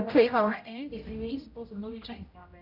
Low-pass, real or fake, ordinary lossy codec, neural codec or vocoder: 5.4 kHz; fake; none; codec, 16 kHz, 0.5 kbps, X-Codec, HuBERT features, trained on general audio